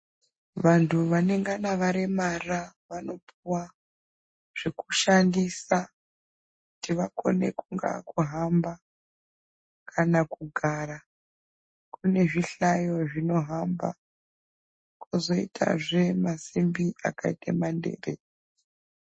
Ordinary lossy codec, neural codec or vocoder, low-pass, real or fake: MP3, 32 kbps; none; 9.9 kHz; real